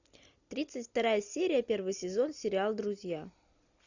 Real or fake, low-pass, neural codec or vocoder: real; 7.2 kHz; none